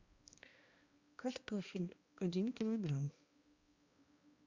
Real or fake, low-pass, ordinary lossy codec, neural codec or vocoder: fake; 7.2 kHz; Opus, 64 kbps; codec, 16 kHz, 1 kbps, X-Codec, HuBERT features, trained on balanced general audio